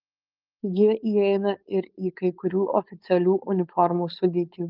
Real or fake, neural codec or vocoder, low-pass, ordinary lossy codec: fake; codec, 16 kHz, 4.8 kbps, FACodec; 5.4 kHz; Opus, 32 kbps